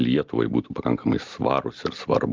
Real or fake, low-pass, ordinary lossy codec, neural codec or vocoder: real; 7.2 kHz; Opus, 32 kbps; none